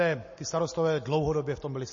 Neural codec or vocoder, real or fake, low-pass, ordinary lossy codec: none; real; 7.2 kHz; MP3, 32 kbps